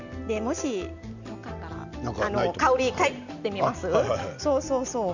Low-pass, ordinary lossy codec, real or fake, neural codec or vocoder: 7.2 kHz; none; real; none